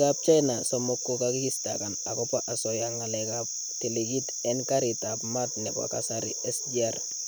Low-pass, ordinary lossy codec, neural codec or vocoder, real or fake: none; none; none; real